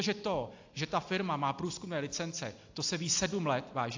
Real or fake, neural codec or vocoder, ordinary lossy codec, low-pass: real; none; AAC, 48 kbps; 7.2 kHz